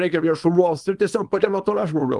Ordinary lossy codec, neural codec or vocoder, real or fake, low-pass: Opus, 24 kbps; codec, 24 kHz, 0.9 kbps, WavTokenizer, small release; fake; 10.8 kHz